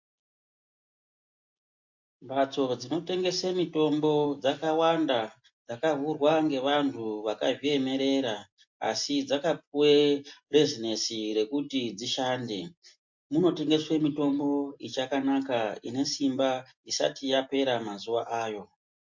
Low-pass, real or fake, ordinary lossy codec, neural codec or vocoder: 7.2 kHz; real; MP3, 48 kbps; none